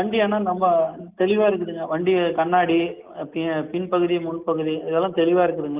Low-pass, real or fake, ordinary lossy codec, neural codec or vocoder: 3.6 kHz; real; Opus, 32 kbps; none